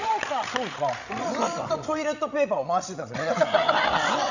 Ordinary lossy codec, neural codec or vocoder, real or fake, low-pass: none; codec, 16 kHz, 16 kbps, FreqCodec, larger model; fake; 7.2 kHz